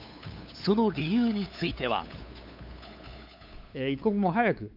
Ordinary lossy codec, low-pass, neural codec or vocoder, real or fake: none; 5.4 kHz; codec, 16 kHz, 8 kbps, FunCodec, trained on LibriTTS, 25 frames a second; fake